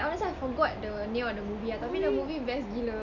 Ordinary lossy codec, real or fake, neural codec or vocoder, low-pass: none; real; none; 7.2 kHz